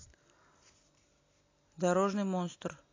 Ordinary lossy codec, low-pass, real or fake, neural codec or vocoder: AAC, 32 kbps; 7.2 kHz; real; none